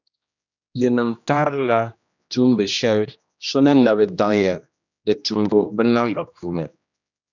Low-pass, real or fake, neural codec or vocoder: 7.2 kHz; fake; codec, 16 kHz, 1 kbps, X-Codec, HuBERT features, trained on general audio